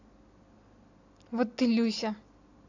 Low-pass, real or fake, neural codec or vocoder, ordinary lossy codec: 7.2 kHz; real; none; AAC, 48 kbps